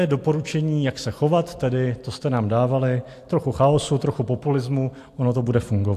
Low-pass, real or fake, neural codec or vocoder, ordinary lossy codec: 14.4 kHz; real; none; AAC, 64 kbps